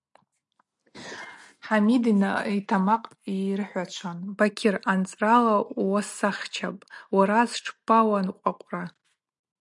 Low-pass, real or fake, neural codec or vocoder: 10.8 kHz; real; none